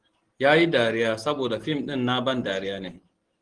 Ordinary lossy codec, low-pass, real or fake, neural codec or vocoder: Opus, 16 kbps; 9.9 kHz; real; none